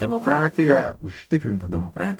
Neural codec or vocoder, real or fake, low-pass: codec, 44.1 kHz, 0.9 kbps, DAC; fake; 19.8 kHz